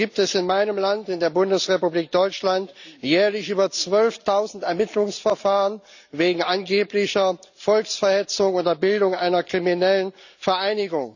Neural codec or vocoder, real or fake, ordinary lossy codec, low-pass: none; real; none; 7.2 kHz